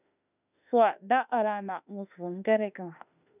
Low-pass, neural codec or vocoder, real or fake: 3.6 kHz; autoencoder, 48 kHz, 32 numbers a frame, DAC-VAE, trained on Japanese speech; fake